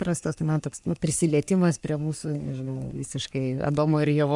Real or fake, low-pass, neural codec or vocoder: fake; 10.8 kHz; codec, 44.1 kHz, 3.4 kbps, Pupu-Codec